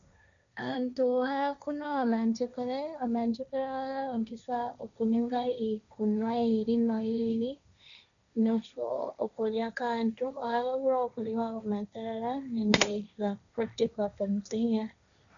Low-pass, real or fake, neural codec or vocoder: 7.2 kHz; fake; codec, 16 kHz, 1.1 kbps, Voila-Tokenizer